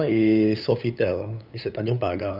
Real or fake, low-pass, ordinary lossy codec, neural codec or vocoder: fake; 5.4 kHz; none; codec, 16 kHz, 8 kbps, FunCodec, trained on LibriTTS, 25 frames a second